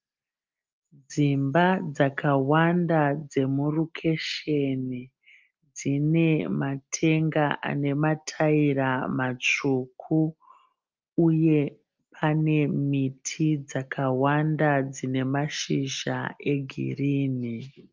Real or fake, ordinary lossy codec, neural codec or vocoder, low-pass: real; Opus, 24 kbps; none; 7.2 kHz